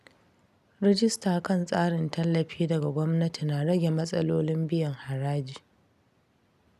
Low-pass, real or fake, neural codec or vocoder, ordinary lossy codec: 14.4 kHz; real; none; none